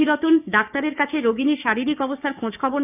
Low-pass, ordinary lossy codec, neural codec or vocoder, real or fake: 3.6 kHz; none; codec, 16 kHz, 6 kbps, DAC; fake